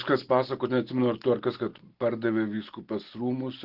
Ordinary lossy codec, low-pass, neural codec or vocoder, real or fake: Opus, 16 kbps; 5.4 kHz; none; real